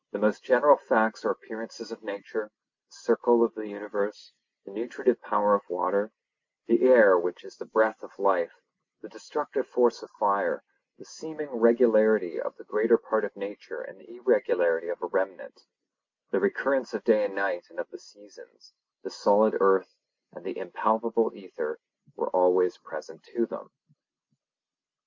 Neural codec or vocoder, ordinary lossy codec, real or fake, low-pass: none; MP3, 48 kbps; real; 7.2 kHz